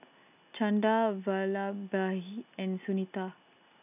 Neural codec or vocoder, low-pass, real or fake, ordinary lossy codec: none; 3.6 kHz; real; none